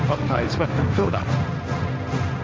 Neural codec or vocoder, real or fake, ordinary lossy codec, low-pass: codec, 16 kHz, 1.1 kbps, Voila-Tokenizer; fake; none; none